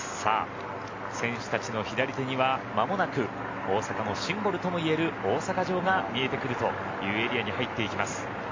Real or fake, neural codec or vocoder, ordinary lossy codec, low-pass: real; none; MP3, 48 kbps; 7.2 kHz